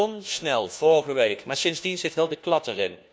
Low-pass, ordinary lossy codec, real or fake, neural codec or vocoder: none; none; fake; codec, 16 kHz, 1 kbps, FunCodec, trained on LibriTTS, 50 frames a second